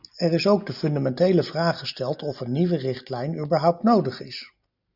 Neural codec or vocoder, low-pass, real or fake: none; 5.4 kHz; real